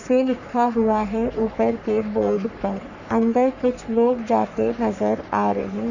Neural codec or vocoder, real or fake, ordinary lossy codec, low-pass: codec, 44.1 kHz, 3.4 kbps, Pupu-Codec; fake; none; 7.2 kHz